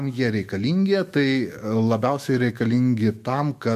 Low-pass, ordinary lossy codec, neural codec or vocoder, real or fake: 14.4 kHz; MP3, 64 kbps; autoencoder, 48 kHz, 128 numbers a frame, DAC-VAE, trained on Japanese speech; fake